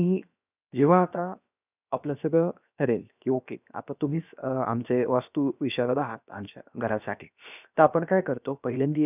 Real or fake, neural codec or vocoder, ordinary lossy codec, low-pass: fake; codec, 16 kHz, 0.7 kbps, FocalCodec; none; 3.6 kHz